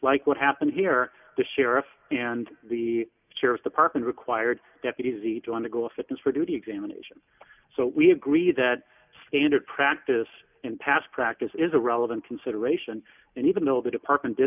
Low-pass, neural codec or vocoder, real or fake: 3.6 kHz; none; real